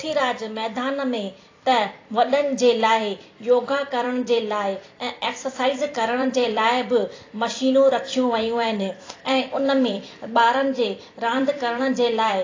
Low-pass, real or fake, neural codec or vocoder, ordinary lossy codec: 7.2 kHz; fake; vocoder, 44.1 kHz, 128 mel bands every 256 samples, BigVGAN v2; AAC, 32 kbps